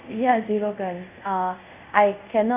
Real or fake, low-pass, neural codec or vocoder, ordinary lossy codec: fake; 3.6 kHz; codec, 24 kHz, 0.5 kbps, DualCodec; none